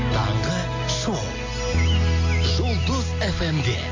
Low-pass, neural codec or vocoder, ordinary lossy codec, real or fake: 7.2 kHz; none; AAC, 32 kbps; real